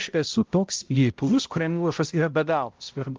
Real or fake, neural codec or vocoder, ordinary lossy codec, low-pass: fake; codec, 16 kHz, 0.5 kbps, X-Codec, HuBERT features, trained on balanced general audio; Opus, 32 kbps; 7.2 kHz